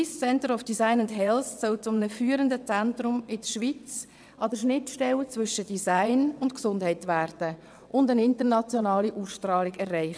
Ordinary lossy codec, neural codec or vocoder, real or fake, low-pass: none; vocoder, 22.05 kHz, 80 mel bands, WaveNeXt; fake; none